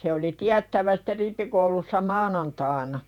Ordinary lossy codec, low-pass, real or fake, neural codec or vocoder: none; 19.8 kHz; fake; vocoder, 48 kHz, 128 mel bands, Vocos